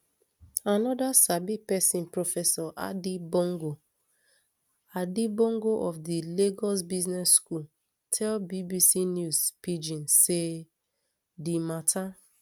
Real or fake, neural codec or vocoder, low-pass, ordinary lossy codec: real; none; none; none